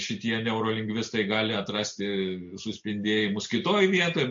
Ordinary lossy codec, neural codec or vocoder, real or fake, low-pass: MP3, 48 kbps; none; real; 7.2 kHz